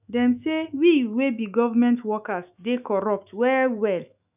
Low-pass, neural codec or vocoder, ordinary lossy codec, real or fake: 3.6 kHz; autoencoder, 48 kHz, 128 numbers a frame, DAC-VAE, trained on Japanese speech; none; fake